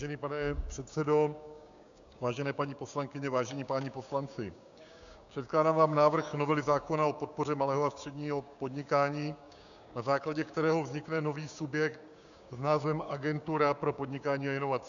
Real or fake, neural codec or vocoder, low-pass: fake; codec, 16 kHz, 6 kbps, DAC; 7.2 kHz